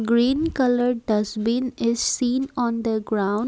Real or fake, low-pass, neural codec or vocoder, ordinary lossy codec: real; none; none; none